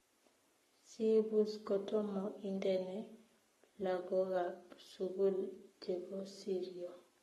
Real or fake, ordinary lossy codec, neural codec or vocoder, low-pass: fake; AAC, 32 kbps; codec, 44.1 kHz, 7.8 kbps, Pupu-Codec; 19.8 kHz